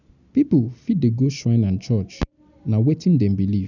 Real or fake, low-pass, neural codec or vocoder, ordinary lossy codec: real; 7.2 kHz; none; none